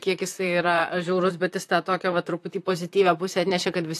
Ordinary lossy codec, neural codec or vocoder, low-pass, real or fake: AAC, 64 kbps; vocoder, 44.1 kHz, 128 mel bands, Pupu-Vocoder; 14.4 kHz; fake